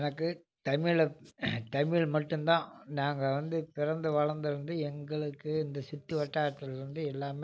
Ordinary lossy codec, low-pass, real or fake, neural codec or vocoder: none; none; real; none